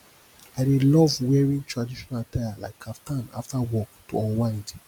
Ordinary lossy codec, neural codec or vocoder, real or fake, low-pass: none; none; real; 19.8 kHz